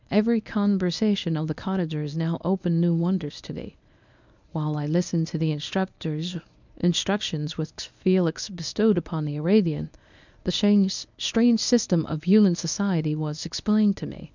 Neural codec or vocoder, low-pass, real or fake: codec, 24 kHz, 0.9 kbps, WavTokenizer, medium speech release version 1; 7.2 kHz; fake